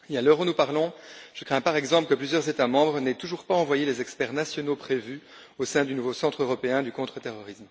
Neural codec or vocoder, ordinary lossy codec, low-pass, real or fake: none; none; none; real